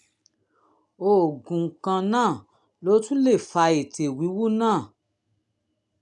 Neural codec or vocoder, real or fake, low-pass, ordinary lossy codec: none; real; 10.8 kHz; none